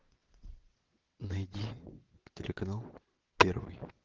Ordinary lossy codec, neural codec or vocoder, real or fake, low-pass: Opus, 16 kbps; vocoder, 22.05 kHz, 80 mel bands, WaveNeXt; fake; 7.2 kHz